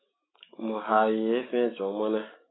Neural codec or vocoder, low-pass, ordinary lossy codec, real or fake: autoencoder, 48 kHz, 128 numbers a frame, DAC-VAE, trained on Japanese speech; 7.2 kHz; AAC, 16 kbps; fake